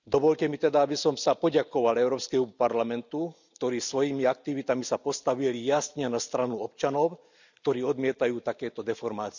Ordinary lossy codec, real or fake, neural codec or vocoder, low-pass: none; real; none; 7.2 kHz